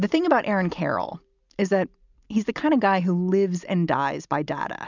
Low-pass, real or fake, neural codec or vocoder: 7.2 kHz; real; none